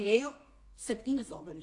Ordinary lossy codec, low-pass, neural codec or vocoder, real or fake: AAC, 48 kbps; 10.8 kHz; codec, 24 kHz, 0.9 kbps, WavTokenizer, medium music audio release; fake